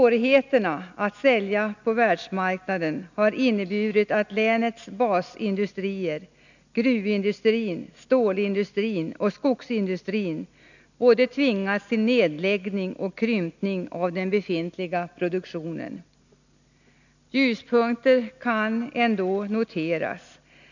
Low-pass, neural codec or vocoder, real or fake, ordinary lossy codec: 7.2 kHz; none; real; none